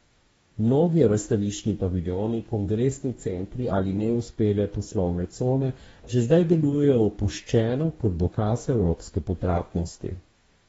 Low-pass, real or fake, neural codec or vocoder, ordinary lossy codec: 19.8 kHz; fake; codec, 44.1 kHz, 2.6 kbps, DAC; AAC, 24 kbps